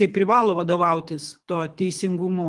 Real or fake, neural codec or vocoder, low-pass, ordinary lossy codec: fake; codec, 24 kHz, 3 kbps, HILCodec; 10.8 kHz; Opus, 24 kbps